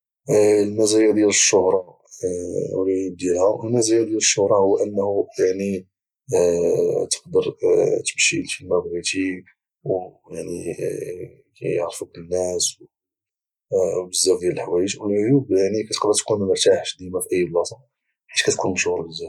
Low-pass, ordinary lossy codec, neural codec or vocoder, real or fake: 19.8 kHz; none; none; real